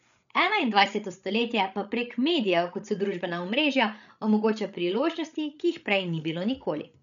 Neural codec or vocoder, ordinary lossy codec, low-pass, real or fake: codec, 16 kHz, 8 kbps, FreqCodec, larger model; none; 7.2 kHz; fake